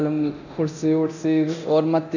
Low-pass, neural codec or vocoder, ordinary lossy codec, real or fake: 7.2 kHz; codec, 24 kHz, 0.9 kbps, DualCodec; none; fake